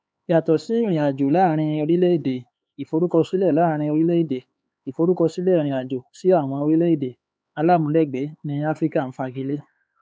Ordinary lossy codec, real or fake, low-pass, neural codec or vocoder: none; fake; none; codec, 16 kHz, 4 kbps, X-Codec, HuBERT features, trained on LibriSpeech